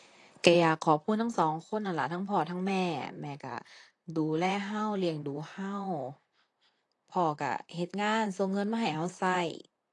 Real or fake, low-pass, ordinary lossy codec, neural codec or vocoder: fake; 10.8 kHz; AAC, 48 kbps; vocoder, 44.1 kHz, 128 mel bands, Pupu-Vocoder